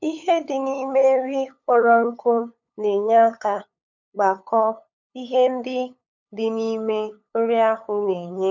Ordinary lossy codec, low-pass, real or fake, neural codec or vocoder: none; 7.2 kHz; fake; codec, 16 kHz, 8 kbps, FunCodec, trained on LibriTTS, 25 frames a second